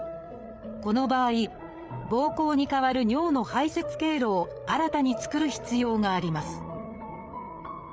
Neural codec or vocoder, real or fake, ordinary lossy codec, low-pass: codec, 16 kHz, 8 kbps, FreqCodec, larger model; fake; none; none